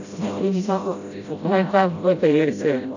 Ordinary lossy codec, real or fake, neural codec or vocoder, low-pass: none; fake; codec, 16 kHz, 0.5 kbps, FreqCodec, smaller model; 7.2 kHz